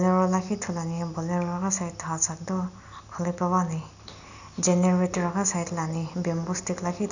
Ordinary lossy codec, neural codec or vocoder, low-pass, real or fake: none; none; 7.2 kHz; real